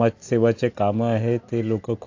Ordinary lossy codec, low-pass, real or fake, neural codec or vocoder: AAC, 48 kbps; 7.2 kHz; real; none